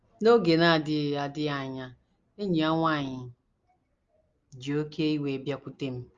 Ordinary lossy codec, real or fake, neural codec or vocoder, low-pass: Opus, 32 kbps; real; none; 7.2 kHz